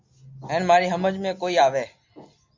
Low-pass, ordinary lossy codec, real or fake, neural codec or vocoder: 7.2 kHz; MP3, 64 kbps; real; none